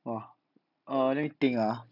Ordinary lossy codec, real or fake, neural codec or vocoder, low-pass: none; real; none; 5.4 kHz